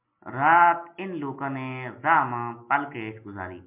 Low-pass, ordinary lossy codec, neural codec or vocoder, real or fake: 3.6 kHz; MP3, 32 kbps; none; real